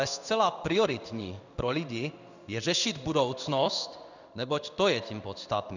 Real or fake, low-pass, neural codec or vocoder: fake; 7.2 kHz; codec, 16 kHz in and 24 kHz out, 1 kbps, XY-Tokenizer